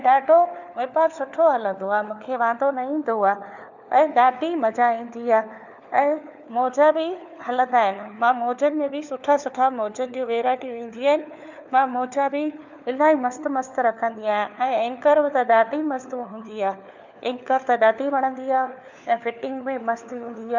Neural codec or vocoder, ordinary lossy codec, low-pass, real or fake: codec, 16 kHz, 4 kbps, FunCodec, trained on LibriTTS, 50 frames a second; none; 7.2 kHz; fake